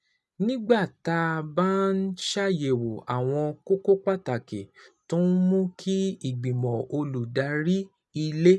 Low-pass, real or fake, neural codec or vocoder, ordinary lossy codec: none; real; none; none